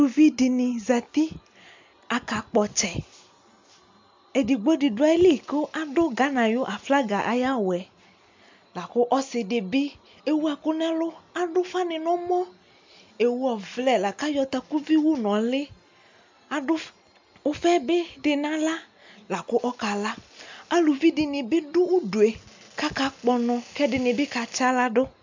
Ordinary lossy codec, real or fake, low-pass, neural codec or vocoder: MP3, 64 kbps; real; 7.2 kHz; none